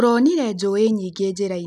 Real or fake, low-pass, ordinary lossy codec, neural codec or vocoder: real; 14.4 kHz; none; none